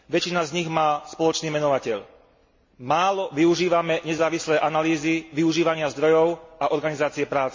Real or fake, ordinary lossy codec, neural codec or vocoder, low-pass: real; MP3, 32 kbps; none; 7.2 kHz